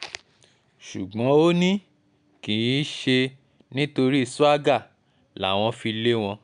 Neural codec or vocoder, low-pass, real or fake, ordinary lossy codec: none; 9.9 kHz; real; none